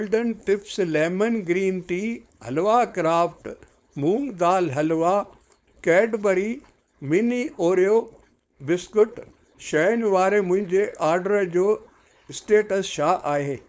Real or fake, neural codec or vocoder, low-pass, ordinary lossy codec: fake; codec, 16 kHz, 4.8 kbps, FACodec; none; none